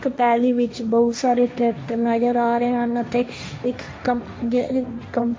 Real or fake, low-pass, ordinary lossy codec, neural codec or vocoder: fake; none; none; codec, 16 kHz, 1.1 kbps, Voila-Tokenizer